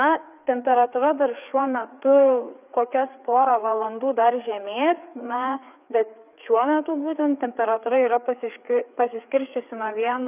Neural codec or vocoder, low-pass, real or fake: codec, 16 kHz, 4 kbps, FreqCodec, larger model; 3.6 kHz; fake